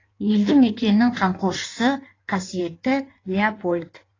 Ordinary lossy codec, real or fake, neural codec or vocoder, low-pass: AAC, 32 kbps; fake; codec, 16 kHz in and 24 kHz out, 1.1 kbps, FireRedTTS-2 codec; 7.2 kHz